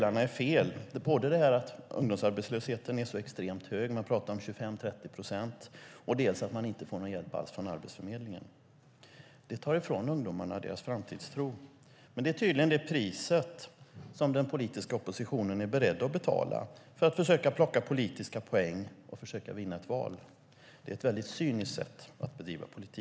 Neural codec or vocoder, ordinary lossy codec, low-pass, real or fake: none; none; none; real